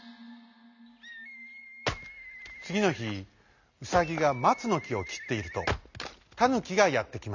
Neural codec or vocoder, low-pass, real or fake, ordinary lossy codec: none; 7.2 kHz; real; none